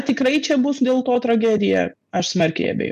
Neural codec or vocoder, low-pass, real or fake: none; 14.4 kHz; real